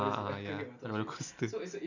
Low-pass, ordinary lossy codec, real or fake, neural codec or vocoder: 7.2 kHz; none; real; none